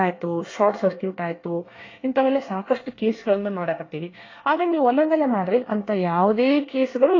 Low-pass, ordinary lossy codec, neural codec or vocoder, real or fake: 7.2 kHz; AAC, 32 kbps; codec, 24 kHz, 1 kbps, SNAC; fake